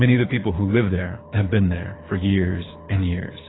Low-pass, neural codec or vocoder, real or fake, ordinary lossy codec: 7.2 kHz; codec, 24 kHz, 6 kbps, HILCodec; fake; AAC, 16 kbps